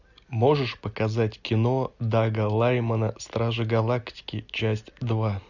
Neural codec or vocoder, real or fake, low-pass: none; real; 7.2 kHz